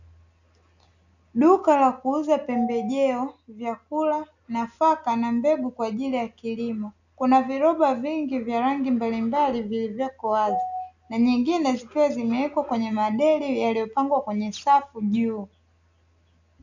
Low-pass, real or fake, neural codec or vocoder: 7.2 kHz; real; none